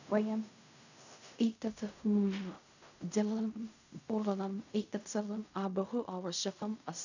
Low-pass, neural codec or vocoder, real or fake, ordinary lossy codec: 7.2 kHz; codec, 16 kHz in and 24 kHz out, 0.4 kbps, LongCat-Audio-Codec, fine tuned four codebook decoder; fake; none